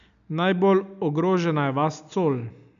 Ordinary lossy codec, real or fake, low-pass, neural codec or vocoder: none; real; 7.2 kHz; none